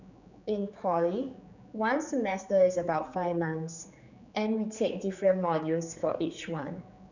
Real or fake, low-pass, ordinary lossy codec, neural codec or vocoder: fake; 7.2 kHz; none; codec, 16 kHz, 4 kbps, X-Codec, HuBERT features, trained on general audio